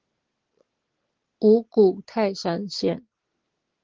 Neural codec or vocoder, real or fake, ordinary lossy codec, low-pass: none; real; Opus, 16 kbps; 7.2 kHz